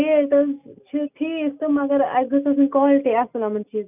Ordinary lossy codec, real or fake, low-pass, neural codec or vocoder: none; real; 3.6 kHz; none